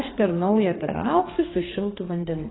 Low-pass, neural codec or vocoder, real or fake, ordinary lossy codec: 7.2 kHz; codec, 32 kHz, 1.9 kbps, SNAC; fake; AAC, 16 kbps